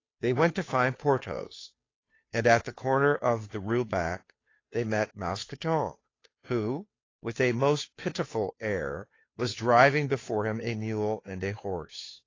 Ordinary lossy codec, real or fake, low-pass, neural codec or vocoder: AAC, 32 kbps; fake; 7.2 kHz; codec, 16 kHz, 2 kbps, FunCodec, trained on Chinese and English, 25 frames a second